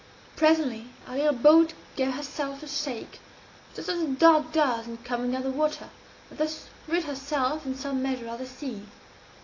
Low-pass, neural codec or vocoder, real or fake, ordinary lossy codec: 7.2 kHz; none; real; AAC, 32 kbps